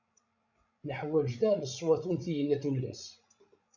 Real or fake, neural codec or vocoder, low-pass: fake; codec, 16 kHz, 16 kbps, FreqCodec, larger model; 7.2 kHz